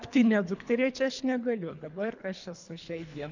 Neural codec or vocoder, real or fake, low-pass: codec, 24 kHz, 3 kbps, HILCodec; fake; 7.2 kHz